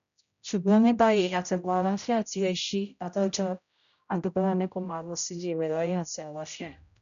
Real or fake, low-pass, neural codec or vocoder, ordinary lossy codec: fake; 7.2 kHz; codec, 16 kHz, 0.5 kbps, X-Codec, HuBERT features, trained on general audio; none